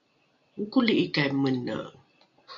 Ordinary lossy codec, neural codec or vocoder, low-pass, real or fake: MP3, 96 kbps; none; 7.2 kHz; real